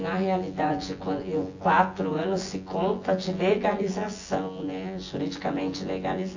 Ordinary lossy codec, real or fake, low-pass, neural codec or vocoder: AAC, 48 kbps; fake; 7.2 kHz; vocoder, 24 kHz, 100 mel bands, Vocos